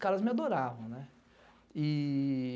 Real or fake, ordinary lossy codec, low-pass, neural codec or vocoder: real; none; none; none